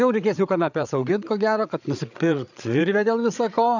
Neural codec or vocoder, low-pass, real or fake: codec, 16 kHz, 8 kbps, FreqCodec, larger model; 7.2 kHz; fake